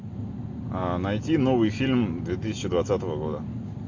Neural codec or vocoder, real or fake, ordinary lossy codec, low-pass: none; real; AAC, 48 kbps; 7.2 kHz